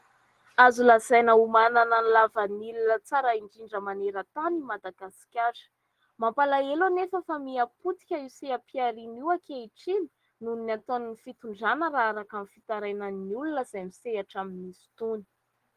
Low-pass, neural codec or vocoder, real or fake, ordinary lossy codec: 14.4 kHz; none; real; Opus, 16 kbps